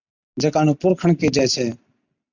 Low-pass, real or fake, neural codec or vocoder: 7.2 kHz; real; none